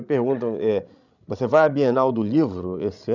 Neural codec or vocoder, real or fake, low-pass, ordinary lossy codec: codec, 16 kHz, 16 kbps, FreqCodec, larger model; fake; 7.2 kHz; none